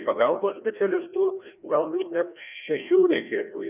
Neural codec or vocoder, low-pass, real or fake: codec, 16 kHz, 1 kbps, FreqCodec, larger model; 3.6 kHz; fake